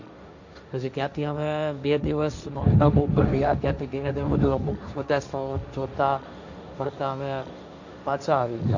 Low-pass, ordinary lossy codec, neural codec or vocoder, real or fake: 7.2 kHz; none; codec, 16 kHz, 1.1 kbps, Voila-Tokenizer; fake